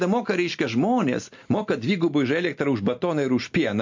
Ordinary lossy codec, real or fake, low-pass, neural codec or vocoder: MP3, 48 kbps; real; 7.2 kHz; none